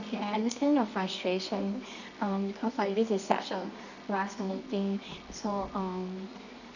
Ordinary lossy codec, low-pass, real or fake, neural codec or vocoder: none; 7.2 kHz; fake; codec, 24 kHz, 0.9 kbps, WavTokenizer, medium music audio release